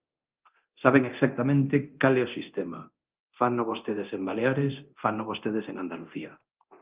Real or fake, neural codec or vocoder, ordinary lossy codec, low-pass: fake; codec, 24 kHz, 0.9 kbps, DualCodec; Opus, 32 kbps; 3.6 kHz